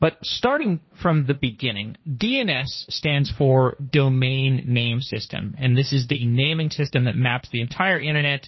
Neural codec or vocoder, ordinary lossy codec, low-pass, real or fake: codec, 16 kHz, 1.1 kbps, Voila-Tokenizer; MP3, 24 kbps; 7.2 kHz; fake